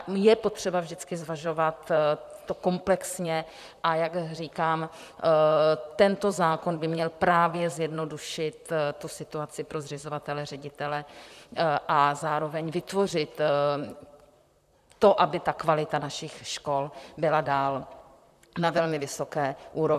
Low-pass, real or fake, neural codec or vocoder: 14.4 kHz; fake; vocoder, 44.1 kHz, 128 mel bands, Pupu-Vocoder